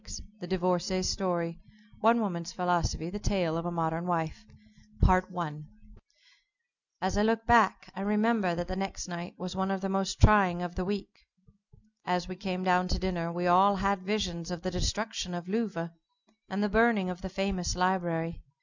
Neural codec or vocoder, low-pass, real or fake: none; 7.2 kHz; real